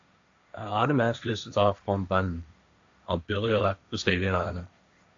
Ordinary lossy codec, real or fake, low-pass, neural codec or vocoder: AAC, 64 kbps; fake; 7.2 kHz; codec, 16 kHz, 1.1 kbps, Voila-Tokenizer